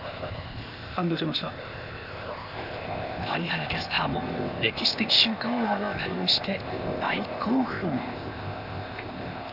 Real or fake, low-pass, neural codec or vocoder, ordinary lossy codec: fake; 5.4 kHz; codec, 16 kHz, 0.8 kbps, ZipCodec; none